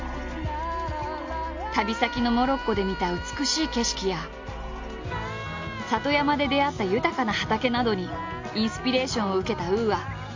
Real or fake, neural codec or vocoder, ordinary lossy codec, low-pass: real; none; MP3, 48 kbps; 7.2 kHz